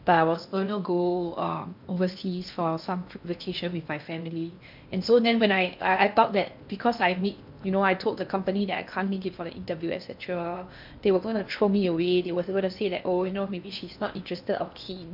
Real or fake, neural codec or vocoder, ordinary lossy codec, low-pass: fake; codec, 16 kHz in and 24 kHz out, 0.8 kbps, FocalCodec, streaming, 65536 codes; MP3, 48 kbps; 5.4 kHz